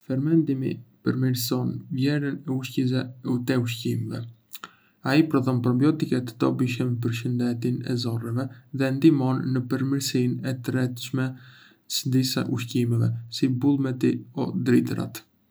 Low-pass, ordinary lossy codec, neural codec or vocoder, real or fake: none; none; none; real